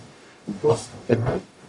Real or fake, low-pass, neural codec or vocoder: fake; 10.8 kHz; codec, 44.1 kHz, 0.9 kbps, DAC